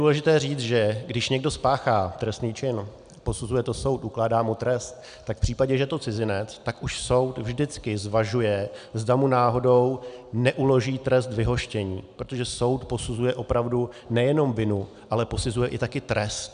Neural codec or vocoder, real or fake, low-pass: none; real; 9.9 kHz